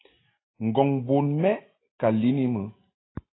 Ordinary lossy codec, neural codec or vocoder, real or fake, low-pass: AAC, 16 kbps; none; real; 7.2 kHz